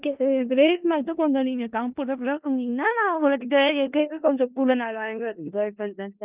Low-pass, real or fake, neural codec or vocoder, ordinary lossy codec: 3.6 kHz; fake; codec, 16 kHz in and 24 kHz out, 0.4 kbps, LongCat-Audio-Codec, four codebook decoder; Opus, 24 kbps